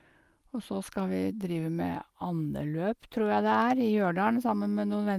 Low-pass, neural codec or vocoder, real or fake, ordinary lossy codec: 14.4 kHz; none; real; Opus, 32 kbps